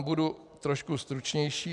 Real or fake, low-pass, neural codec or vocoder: real; 10.8 kHz; none